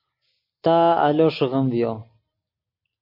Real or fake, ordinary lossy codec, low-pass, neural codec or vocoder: real; MP3, 32 kbps; 5.4 kHz; none